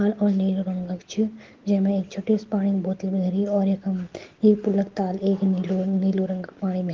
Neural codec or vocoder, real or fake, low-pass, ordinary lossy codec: none; real; 7.2 kHz; Opus, 16 kbps